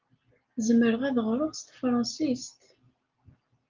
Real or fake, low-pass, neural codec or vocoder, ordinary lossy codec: real; 7.2 kHz; none; Opus, 32 kbps